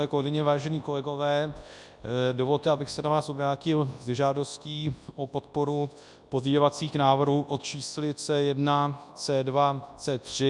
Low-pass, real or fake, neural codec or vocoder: 10.8 kHz; fake; codec, 24 kHz, 0.9 kbps, WavTokenizer, large speech release